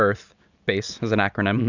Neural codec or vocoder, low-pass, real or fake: none; 7.2 kHz; real